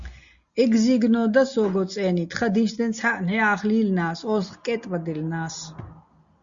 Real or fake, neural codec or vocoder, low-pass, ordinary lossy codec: real; none; 7.2 kHz; Opus, 64 kbps